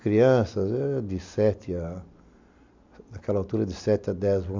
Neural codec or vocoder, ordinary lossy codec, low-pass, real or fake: none; MP3, 64 kbps; 7.2 kHz; real